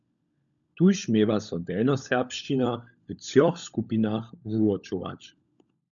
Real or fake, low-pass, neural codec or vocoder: fake; 7.2 kHz; codec, 16 kHz, 16 kbps, FunCodec, trained on LibriTTS, 50 frames a second